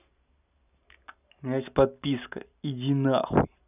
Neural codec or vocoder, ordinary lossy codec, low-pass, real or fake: none; none; 3.6 kHz; real